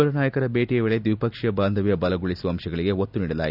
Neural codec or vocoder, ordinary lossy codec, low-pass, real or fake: none; none; 5.4 kHz; real